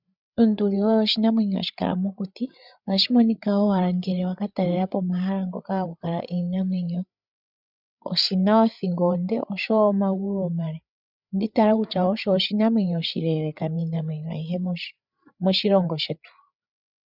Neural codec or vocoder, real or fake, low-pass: codec, 16 kHz, 8 kbps, FreqCodec, larger model; fake; 5.4 kHz